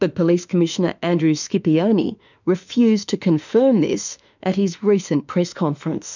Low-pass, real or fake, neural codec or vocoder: 7.2 kHz; fake; autoencoder, 48 kHz, 32 numbers a frame, DAC-VAE, trained on Japanese speech